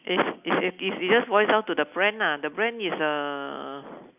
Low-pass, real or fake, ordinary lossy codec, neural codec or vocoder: 3.6 kHz; real; none; none